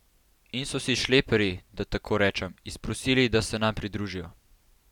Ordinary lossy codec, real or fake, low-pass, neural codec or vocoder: none; real; 19.8 kHz; none